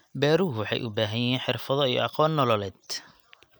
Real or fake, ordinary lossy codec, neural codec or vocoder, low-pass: real; none; none; none